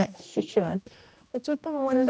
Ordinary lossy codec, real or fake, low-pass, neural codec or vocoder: none; fake; none; codec, 16 kHz, 1 kbps, X-Codec, HuBERT features, trained on general audio